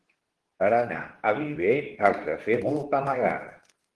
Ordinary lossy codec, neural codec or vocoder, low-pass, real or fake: Opus, 16 kbps; codec, 24 kHz, 0.9 kbps, WavTokenizer, medium speech release version 2; 10.8 kHz; fake